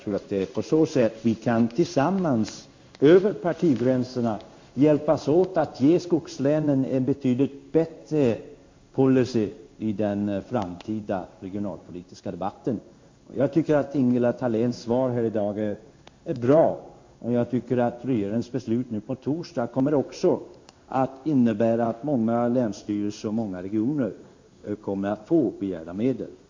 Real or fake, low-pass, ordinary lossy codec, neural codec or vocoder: fake; 7.2 kHz; MP3, 48 kbps; codec, 16 kHz in and 24 kHz out, 1 kbps, XY-Tokenizer